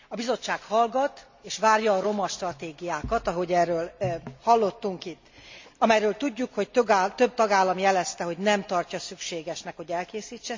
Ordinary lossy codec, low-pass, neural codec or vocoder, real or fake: MP3, 64 kbps; 7.2 kHz; none; real